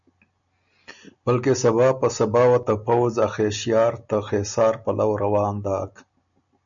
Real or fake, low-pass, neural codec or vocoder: real; 7.2 kHz; none